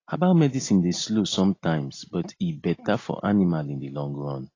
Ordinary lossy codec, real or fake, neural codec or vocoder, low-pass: AAC, 32 kbps; real; none; 7.2 kHz